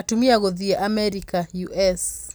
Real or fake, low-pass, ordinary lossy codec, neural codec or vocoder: real; none; none; none